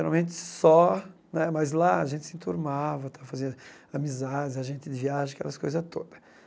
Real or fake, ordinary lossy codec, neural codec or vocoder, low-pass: real; none; none; none